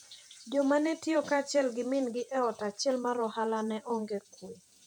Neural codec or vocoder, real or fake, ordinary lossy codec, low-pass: vocoder, 48 kHz, 128 mel bands, Vocos; fake; none; 19.8 kHz